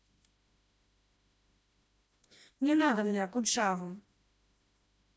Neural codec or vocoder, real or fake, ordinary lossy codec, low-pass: codec, 16 kHz, 1 kbps, FreqCodec, smaller model; fake; none; none